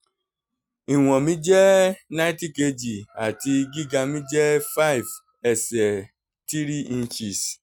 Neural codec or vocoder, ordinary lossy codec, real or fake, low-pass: none; none; real; 19.8 kHz